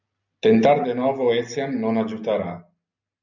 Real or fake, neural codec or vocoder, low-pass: real; none; 7.2 kHz